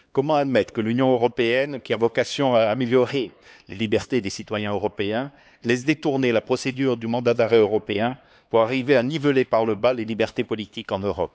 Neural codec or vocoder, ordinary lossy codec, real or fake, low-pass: codec, 16 kHz, 2 kbps, X-Codec, HuBERT features, trained on LibriSpeech; none; fake; none